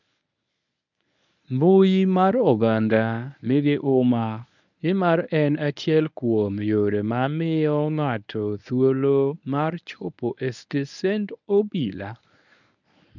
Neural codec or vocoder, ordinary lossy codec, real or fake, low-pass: codec, 24 kHz, 0.9 kbps, WavTokenizer, medium speech release version 1; none; fake; 7.2 kHz